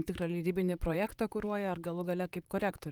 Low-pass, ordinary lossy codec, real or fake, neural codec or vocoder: 19.8 kHz; Opus, 32 kbps; fake; vocoder, 44.1 kHz, 128 mel bands, Pupu-Vocoder